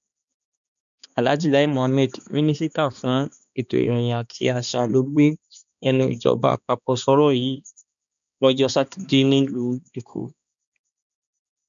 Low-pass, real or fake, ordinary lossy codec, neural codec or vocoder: 7.2 kHz; fake; none; codec, 16 kHz, 2 kbps, X-Codec, HuBERT features, trained on balanced general audio